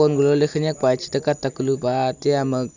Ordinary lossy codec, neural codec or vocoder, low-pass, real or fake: none; none; 7.2 kHz; real